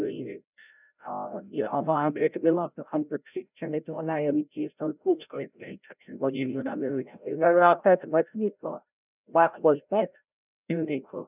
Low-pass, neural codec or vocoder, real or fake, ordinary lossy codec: 3.6 kHz; codec, 16 kHz, 0.5 kbps, FreqCodec, larger model; fake; none